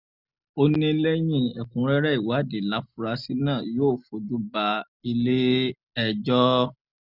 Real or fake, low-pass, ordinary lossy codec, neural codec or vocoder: real; 5.4 kHz; none; none